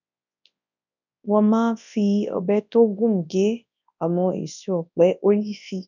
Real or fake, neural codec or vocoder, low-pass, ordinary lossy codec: fake; codec, 24 kHz, 0.9 kbps, WavTokenizer, large speech release; 7.2 kHz; none